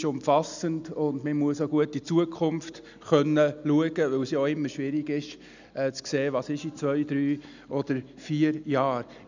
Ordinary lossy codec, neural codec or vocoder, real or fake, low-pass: none; none; real; 7.2 kHz